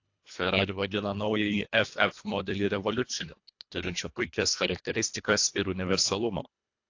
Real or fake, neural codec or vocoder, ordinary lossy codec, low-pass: fake; codec, 24 kHz, 1.5 kbps, HILCodec; AAC, 48 kbps; 7.2 kHz